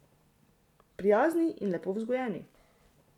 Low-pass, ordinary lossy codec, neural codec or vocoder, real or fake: 19.8 kHz; none; vocoder, 44.1 kHz, 128 mel bands every 512 samples, BigVGAN v2; fake